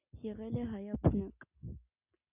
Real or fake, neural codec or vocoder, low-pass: real; none; 3.6 kHz